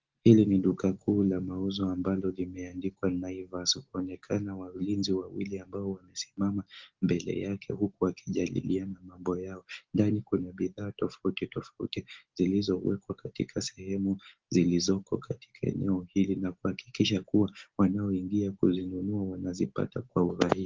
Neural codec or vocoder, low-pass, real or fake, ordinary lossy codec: none; 7.2 kHz; real; Opus, 16 kbps